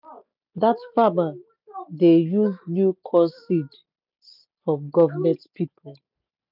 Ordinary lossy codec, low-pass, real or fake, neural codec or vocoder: none; 5.4 kHz; real; none